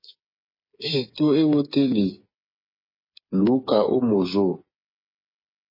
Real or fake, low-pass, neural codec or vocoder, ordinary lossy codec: fake; 5.4 kHz; codec, 16 kHz, 8 kbps, FreqCodec, smaller model; MP3, 24 kbps